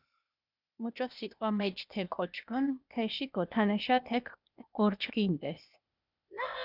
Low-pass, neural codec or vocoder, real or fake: 5.4 kHz; codec, 16 kHz, 0.8 kbps, ZipCodec; fake